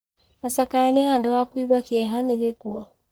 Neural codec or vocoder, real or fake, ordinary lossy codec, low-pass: codec, 44.1 kHz, 1.7 kbps, Pupu-Codec; fake; none; none